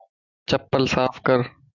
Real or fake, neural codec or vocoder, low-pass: real; none; 7.2 kHz